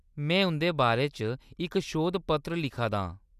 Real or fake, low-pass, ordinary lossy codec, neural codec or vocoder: real; 14.4 kHz; none; none